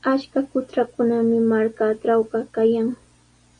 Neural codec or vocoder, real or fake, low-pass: none; real; 9.9 kHz